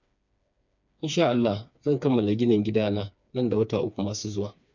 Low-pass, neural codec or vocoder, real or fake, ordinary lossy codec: 7.2 kHz; codec, 16 kHz, 4 kbps, FreqCodec, smaller model; fake; none